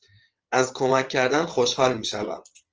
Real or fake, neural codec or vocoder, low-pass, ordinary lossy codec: fake; vocoder, 22.05 kHz, 80 mel bands, WaveNeXt; 7.2 kHz; Opus, 24 kbps